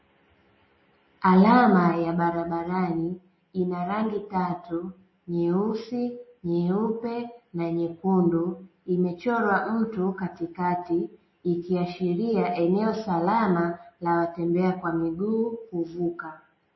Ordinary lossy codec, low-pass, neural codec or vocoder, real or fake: MP3, 24 kbps; 7.2 kHz; none; real